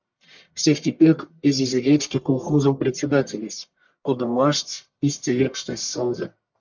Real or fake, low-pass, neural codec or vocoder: fake; 7.2 kHz; codec, 44.1 kHz, 1.7 kbps, Pupu-Codec